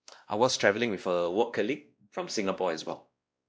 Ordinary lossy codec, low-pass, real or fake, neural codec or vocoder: none; none; fake; codec, 16 kHz, 1 kbps, X-Codec, WavLM features, trained on Multilingual LibriSpeech